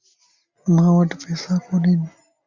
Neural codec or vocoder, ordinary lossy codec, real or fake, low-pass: none; Opus, 64 kbps; real; 7.2 kHz